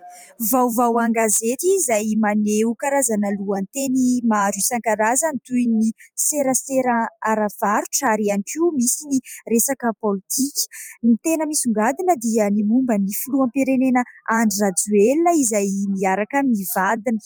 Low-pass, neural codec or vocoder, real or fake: 19.8 kHz; vocoder, 44.1 kHz, 128 mel bands every 512 samples, BigVGAN v2; fake